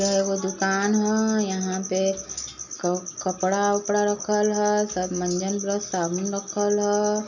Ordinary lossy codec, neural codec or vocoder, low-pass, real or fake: none; none; 7.2 kHz; real